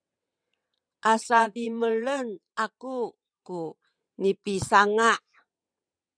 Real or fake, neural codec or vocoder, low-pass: fake; vocoder, 22.05 kHz, 80 mel bands, WaveNeXt; 9.9 kHz